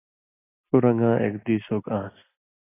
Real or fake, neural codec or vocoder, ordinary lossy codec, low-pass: real; none; AAC, 16 kbps; 3.6 kHz